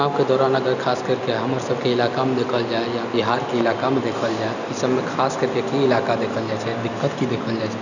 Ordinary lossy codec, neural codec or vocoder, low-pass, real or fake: none; none; 7.2 kHz; real